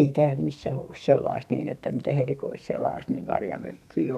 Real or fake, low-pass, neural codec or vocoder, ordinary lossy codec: fake; 14.4 kHz; codec, 32 kHz, 1.9 kbps, SNAC; none